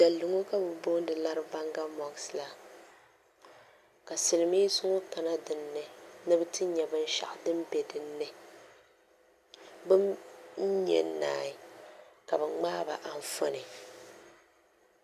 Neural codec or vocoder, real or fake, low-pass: none; real; 14.4 kHz